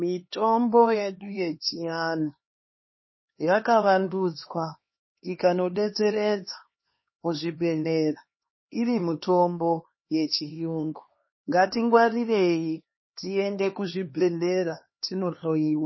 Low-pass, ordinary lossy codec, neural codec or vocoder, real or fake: 7.2 kHz; MP3, 24 kbps; codec, 16 kHz, 2 kbps, X-Codec, HuBERT features, trained on LibriSpeech; fake